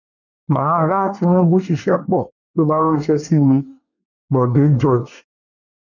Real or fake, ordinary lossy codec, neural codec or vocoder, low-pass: fake; none; codec, 24 kHz, 1 kbps, SNAC; 7.2 kHz